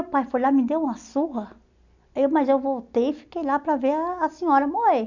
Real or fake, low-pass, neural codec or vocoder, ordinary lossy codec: real; 7.2 kHz; none; none